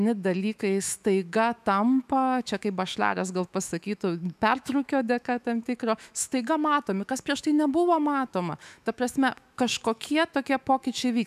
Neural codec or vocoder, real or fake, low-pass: autoencoder, 48 kHz, 128 numbers a frame, DAC-VAE, trained on Japanese speech; fake; 14.4 kHz